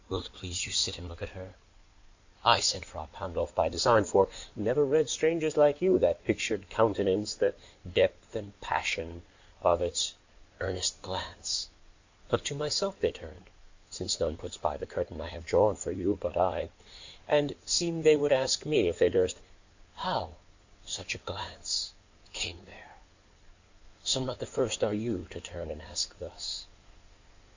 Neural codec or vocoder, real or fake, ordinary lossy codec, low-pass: codec, 16 kHz in and 24 kHz out, 2.2 kbps, FireRedTTS-2 codec; fake; Opus, 64 kbps; 7.2 kHz